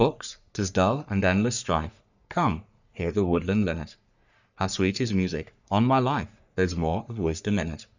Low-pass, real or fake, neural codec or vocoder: 7.2 kHz; fake; codec, 44.1 kHz, 3.4 kbps, Pupu-Codec